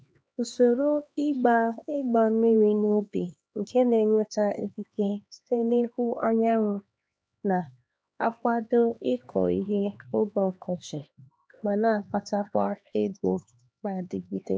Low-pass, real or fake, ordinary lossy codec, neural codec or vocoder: none; fake; none; codec, 16 kHz, 2 kbps, X-Codec, HuBERT features, trained on LibriSpeech